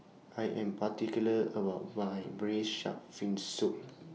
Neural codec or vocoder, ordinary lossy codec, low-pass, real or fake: none; none; none; real